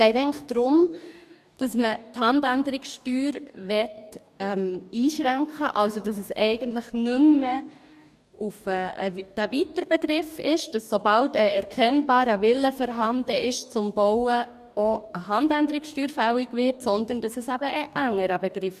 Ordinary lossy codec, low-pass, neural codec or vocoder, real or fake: none; 14.4 kHz; codec, 44.1 kHz, 2.6 kbps, DAC; fake